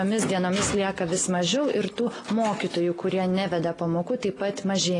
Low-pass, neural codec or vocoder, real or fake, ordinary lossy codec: 10.8 kHz; none; real; AAC, 32 kbps